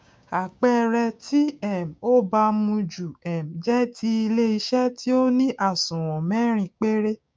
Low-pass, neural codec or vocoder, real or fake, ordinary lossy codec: none; codec, 16 kHz, 6 kbps, DAC; fake; none